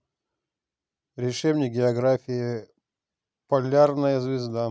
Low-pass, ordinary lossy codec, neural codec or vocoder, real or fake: none; none; none; real